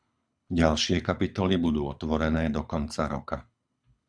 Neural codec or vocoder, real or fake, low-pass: codec, 24 kHz, 6 kbps, HILCodec; fake; 9.9 kHz